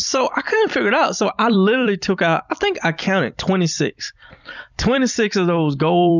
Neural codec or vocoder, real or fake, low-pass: vocoder, 44.1 kHz, 128 mel bands every 256 samples, BigVGAN v2; fake; 7.2 kHz